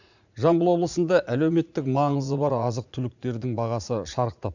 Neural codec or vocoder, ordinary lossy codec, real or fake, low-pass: vocoder, 44.1 kHz, 80 mel bands, Vocos; none; fake; 7.2 kHz